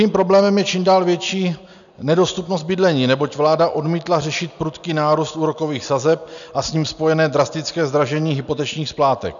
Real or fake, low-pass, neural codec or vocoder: real; 7.2 kHz; none